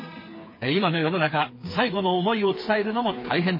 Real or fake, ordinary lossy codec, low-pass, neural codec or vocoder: fake; MP3, 24 kbps; 5.4 kHz; codec, 16 kHz, 4 kbps, FreqCodec, smaller model